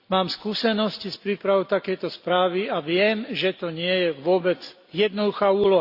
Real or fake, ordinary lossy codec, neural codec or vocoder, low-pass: real; Opus, 64 kbps; none; 5.4 kHz